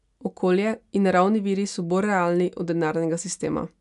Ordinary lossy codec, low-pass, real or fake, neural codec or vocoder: none; 10.8 kHz; real; none